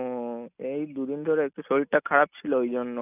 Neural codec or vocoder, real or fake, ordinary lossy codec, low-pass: none; real; none; 3.6 kHz